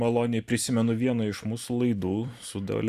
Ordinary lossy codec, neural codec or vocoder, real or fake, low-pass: Opus, 64 kbps; vocoder, 44.1 kHz, 128 mel bands every 256 samples, BigVGAN v2; fake; 14.4 kHz